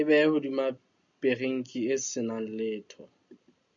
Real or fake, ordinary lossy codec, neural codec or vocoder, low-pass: real; AAC, 64 kbps; none; 7.2 kHz